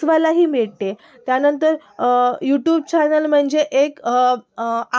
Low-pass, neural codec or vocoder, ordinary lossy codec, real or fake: none; none; none; real